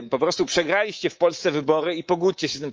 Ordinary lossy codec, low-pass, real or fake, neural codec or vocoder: Opus, 24 kbps; 7.2 kHz; fake; codec, 24 kHz, 3.1 kbps, DualCodec